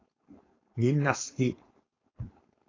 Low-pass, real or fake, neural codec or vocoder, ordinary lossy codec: 7.2 kHz; fake; codec, 16 kHz, 4.8 kbps, FACodec; AAC, 32 kbps